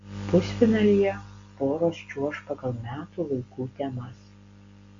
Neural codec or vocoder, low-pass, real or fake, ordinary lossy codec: none; 7.2 kHz; real; MP3, 64 kbps